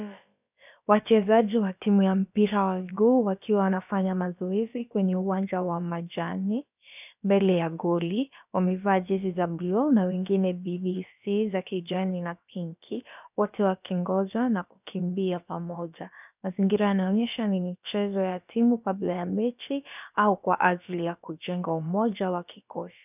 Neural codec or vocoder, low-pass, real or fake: codec, 16 kHz, about 1 kbps, DyCAST, with the encoder's durations; 3.6 kHz; fake